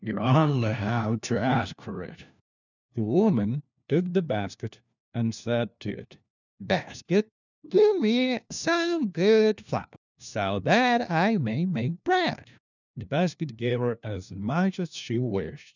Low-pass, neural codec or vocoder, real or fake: 7.2 kHz; codec, 16 kHz, 1 kbps, FunCodec, trained on LibriTTS, 50 frames a second; fake